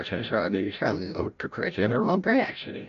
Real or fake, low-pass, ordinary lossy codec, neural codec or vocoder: fake; 7.2 kHz; none; codec, 16 kHz, 0.5 kbps, FreqCodec, larger model